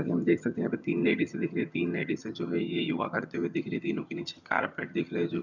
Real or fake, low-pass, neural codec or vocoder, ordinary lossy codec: fake; 7.2 kHz; vocoder, 22.05 kHz, 80 mel bands, HiFi-GAN; none